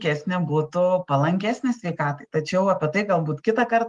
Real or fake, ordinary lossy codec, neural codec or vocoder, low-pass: real; Opus, 24 kbps; none; 10.8 kHz